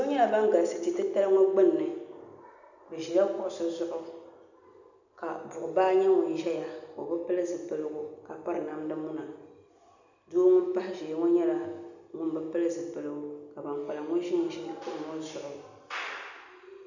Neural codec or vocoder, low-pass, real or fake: none; 7.2 kHz; real